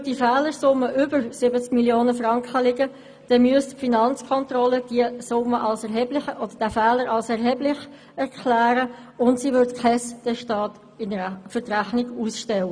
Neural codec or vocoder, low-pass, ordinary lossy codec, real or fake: none; 9.9 kHz; none; real